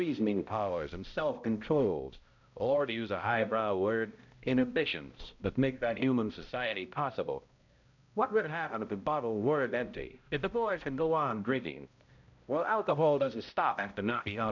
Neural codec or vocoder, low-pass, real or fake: codec, 16 kHz, 0.5 kbps, X-Codec, HuBERT features, trained on balanced general audio; 7.2 kHz; fake